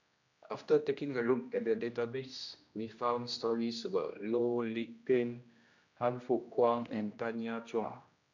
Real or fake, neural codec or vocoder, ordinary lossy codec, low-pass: fake; codec, 16 kHz, 1 kbps, X-Codec, HuBERT features, trained on general audio; none; 7.2 kHz